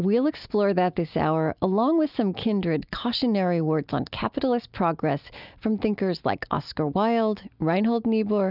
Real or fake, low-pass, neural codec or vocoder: real; 5.4 kHz; none